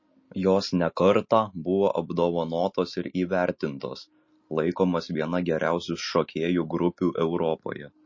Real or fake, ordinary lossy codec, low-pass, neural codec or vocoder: real; MP3, 32 kbps; 7.2 kHz; none